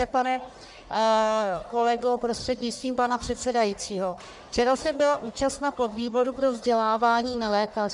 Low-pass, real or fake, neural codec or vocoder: 10.8 kHz; fake; codec, 44.1 kHz, 1.7 kbps, Pupu-Codec